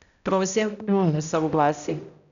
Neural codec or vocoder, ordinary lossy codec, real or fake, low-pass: codec, 16 kHz, 0.5 kbps, X-Codec, HuBERT features, trained on balanced general audio; none; fake; 7.2 kHz